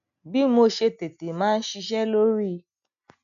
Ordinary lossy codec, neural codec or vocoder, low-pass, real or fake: none; none; 7.2 kHz; real